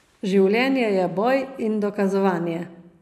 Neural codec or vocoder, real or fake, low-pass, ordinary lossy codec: none; real; 14.4 kHz; none